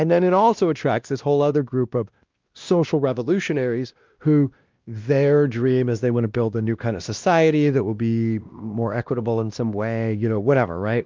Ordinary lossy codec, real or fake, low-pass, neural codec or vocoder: Opus, 32 kbps; fake; 7.2 kHz; codec, 16 kHz, 1 kbps, X-Codec, WavLM features, trained on Multilingual LibriSpeech